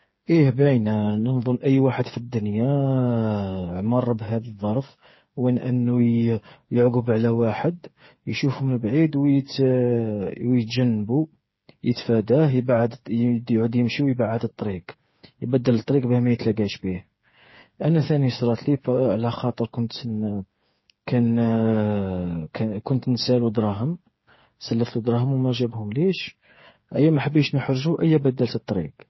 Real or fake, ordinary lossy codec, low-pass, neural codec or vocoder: fake; MP3, 24 kbps; 7.2 kHz; codec, 16 kHz, 8 kbps, FreqCodec, smaller model